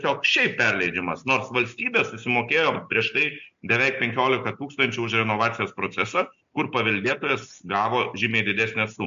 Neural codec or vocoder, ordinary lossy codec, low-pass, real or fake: none; MP3, 64 kbps; 7.2 kHz; real